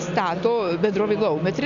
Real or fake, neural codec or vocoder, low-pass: real; none; 7.2 kHz